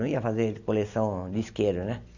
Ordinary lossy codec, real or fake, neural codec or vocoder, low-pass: none; real; none; 7.2 kHz